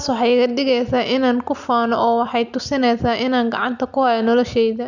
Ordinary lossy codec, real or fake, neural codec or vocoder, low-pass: none; real; none; 7.2 kHz